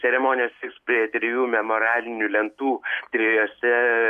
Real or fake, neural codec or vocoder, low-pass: real; none; 14.4 kHz